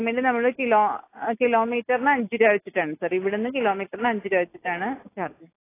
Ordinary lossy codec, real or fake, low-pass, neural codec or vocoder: AAC, 24 kbps; real; 3.6 kHz; none